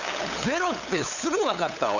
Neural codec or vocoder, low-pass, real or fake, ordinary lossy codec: codec, 16 kHz, 8 kbps, FunCodec, trained on LibriTTS, 25 frames a second; 7.2 kHz; fake; none